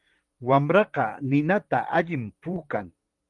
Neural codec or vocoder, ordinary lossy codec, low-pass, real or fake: vocoder, 44.1 kHz, 128 mel bands, Pupu-Vocoder; Opus, 24 kbps; 10.8 kHz; fake